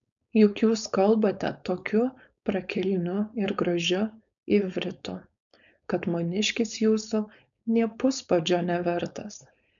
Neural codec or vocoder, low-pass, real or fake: codec, 16 kHz, 4.8 kbps, FACodec; 7.2 kHz; fake